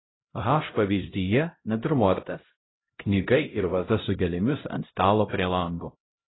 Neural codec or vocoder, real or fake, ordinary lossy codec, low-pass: codec, 16 kHz, 0.5 kbps, X-Codec, HuBERT features, trained on LibriSpeech; fake; AAC, 16 kbps; 7.2 kHz